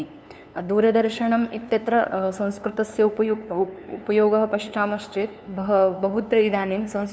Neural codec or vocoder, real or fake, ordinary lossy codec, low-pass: codec, 16 kHz, 2 kbps, FunCodec, trained on LibriTTS, 25 frames a second; fake; none; none